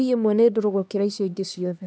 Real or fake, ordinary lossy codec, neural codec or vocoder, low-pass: fake; none; codec, 16 kHz, 2 kbps, X-Codec, HuBERT features, trained on LibriSpeech; none